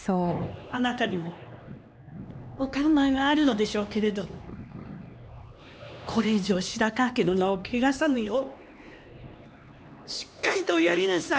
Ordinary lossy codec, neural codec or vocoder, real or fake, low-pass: none; codec, 16 kHz, 2 kbps, X-Codec, HuBERT features, trained on LibriSpeech; fake; none